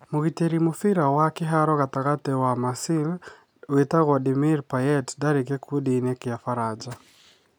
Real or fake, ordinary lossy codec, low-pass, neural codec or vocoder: real; none; none; none